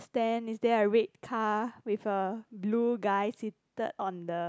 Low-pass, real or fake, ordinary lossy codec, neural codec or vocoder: none; real; none; none